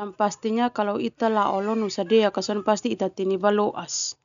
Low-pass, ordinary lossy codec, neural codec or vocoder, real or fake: 7.2 kHz; none; none; real